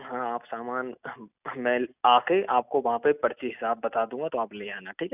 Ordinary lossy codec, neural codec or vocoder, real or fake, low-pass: none; none; real; 3.6 kHz